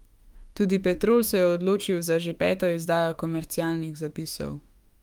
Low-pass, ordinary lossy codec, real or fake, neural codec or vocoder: 19.8 kHz; Opus, 32 kbps; fake; autoencoder, 48 kHz, 32 numbers a frame, DAC-VAE, trained on Japanese speech